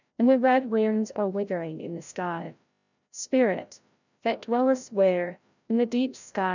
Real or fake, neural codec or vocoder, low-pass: fake; codec, 16 kHz, 0.5 kbps, FreqCodec, larger model; 7.2 kHz